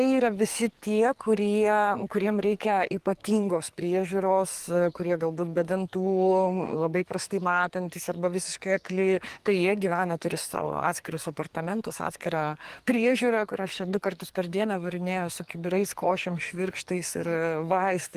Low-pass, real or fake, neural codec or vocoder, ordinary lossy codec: 14.4 kHz; fake; codec, 44.1 kHz, 2.6 kbps, SNAC; Opus, 24 kbps